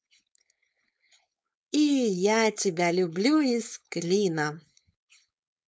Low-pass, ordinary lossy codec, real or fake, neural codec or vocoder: none; none; fake; codec, 16 kHz, 4.8 kbps, FACodec